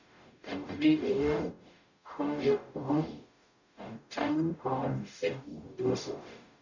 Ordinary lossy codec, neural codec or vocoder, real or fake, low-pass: none; codec, 44.1 kHz, 0.9 kbps, DAC; fake; 7.2 kHz